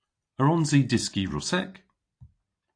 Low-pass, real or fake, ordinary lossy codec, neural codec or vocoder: 9.9 kHz; real; AAC, 48 kbps; none